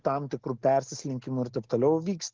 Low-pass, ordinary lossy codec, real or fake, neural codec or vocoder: 7.2 kHz; Opus, 16 kbps; real; none